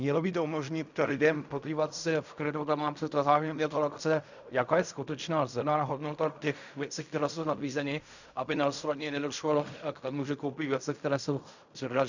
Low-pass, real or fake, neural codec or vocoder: 7.2 kHz; fake; codec, 16 kHz in and 24 kHz out, 0.4 kbps, LongCat-Audio-Codec, fine tuned four codebook decoder